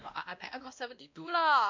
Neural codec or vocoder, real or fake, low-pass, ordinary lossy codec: codec, 16 kHz, 1 kbps, X-Codec, WavLM features, trained on Multilingual LibriSpeech; fake; 7.2 kHz; MP3, 64 kbps